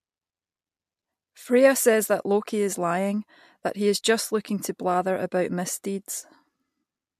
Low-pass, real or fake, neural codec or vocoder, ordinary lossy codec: 14.4 kHz; real; none; MP3, 64 kbps